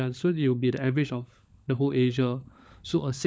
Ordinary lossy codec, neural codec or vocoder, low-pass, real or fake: none; codec, 16 kHz, 16 kbps, FunCodec, trained on LibriTTS, 50 frames a second; none; fake